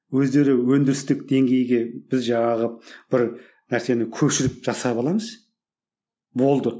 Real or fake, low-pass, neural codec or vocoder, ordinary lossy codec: real; none; none; none